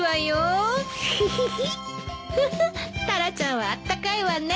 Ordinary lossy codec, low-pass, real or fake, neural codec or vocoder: none; none; real; none